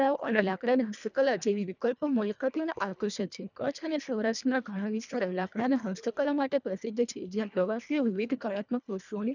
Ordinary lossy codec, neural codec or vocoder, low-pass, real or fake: none; codec, 24 kHz, 1.5 kbps, HILCodec; 7.2 kHz; fake